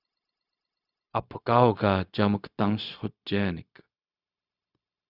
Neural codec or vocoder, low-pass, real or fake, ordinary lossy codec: codec, 16 kHz, 0.4 kbps, LongCat-Audio-Codec; 5.4 kHz; fake; Opus, 64 kbps